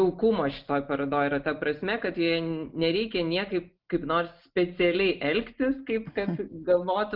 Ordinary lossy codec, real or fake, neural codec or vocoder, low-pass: Opus, 32 kbps; real; none; 5.4 kHz